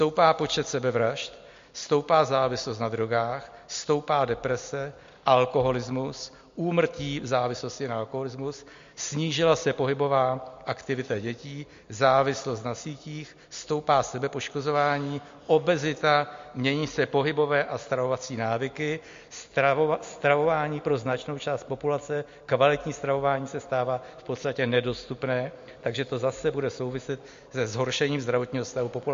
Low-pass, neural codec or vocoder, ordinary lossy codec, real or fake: 7.2 kHz; none; MP3, 48 kbps; real